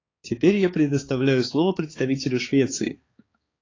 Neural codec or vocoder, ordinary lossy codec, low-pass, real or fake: codec, 16 kHz, 4 kbps, X-Codec, HuBERT features, trained on balanced general audio; AAC, 32 kbps; 7.2 kHz; fake